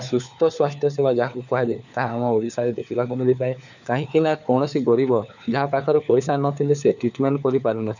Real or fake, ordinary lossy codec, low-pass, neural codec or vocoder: fake; none; 7.2 kHz; codec, 16 kHz, 4 kbps, FunCodec, trained on LibriTTS, 50 frames a second